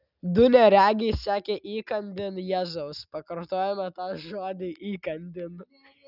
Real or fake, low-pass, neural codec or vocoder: real; 5.4 kHz; none